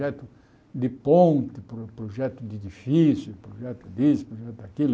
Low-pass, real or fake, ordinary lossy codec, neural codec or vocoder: none; real; none; none